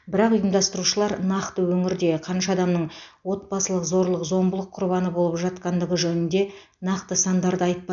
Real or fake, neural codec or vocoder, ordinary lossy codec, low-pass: real; none; none; 7.2 kHz